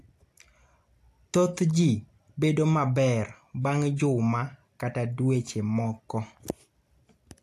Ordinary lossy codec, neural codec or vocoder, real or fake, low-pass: AAC, 64 kbps; none; real; 14.4 kHz